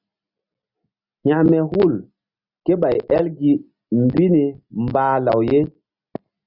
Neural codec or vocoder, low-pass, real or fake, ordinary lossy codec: none; 5.4 kHz; real; Opus, 64 kbps